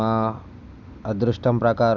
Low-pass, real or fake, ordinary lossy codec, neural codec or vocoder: 7.2 kHz; real; none; none